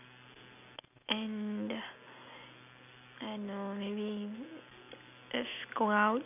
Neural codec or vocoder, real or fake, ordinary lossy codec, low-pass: none; real; none; 3.6 kHz